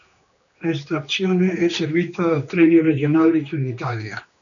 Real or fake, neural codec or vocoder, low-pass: fake; codec, 16 kHz, 2 kbps, X-Codec, HuBERT features, trained on general audio; 7.2 kHz